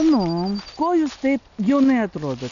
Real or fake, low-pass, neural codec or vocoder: real; 7.2 kHz; none